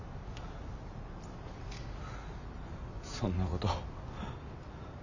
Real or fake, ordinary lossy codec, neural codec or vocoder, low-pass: real; none; none; 7.2 kHz